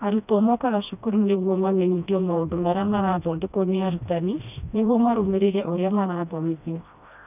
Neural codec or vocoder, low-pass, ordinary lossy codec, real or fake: codec, 16 kHz, 1 kbps, FreqCodec, smaller model; 3.6 kHz; none; fake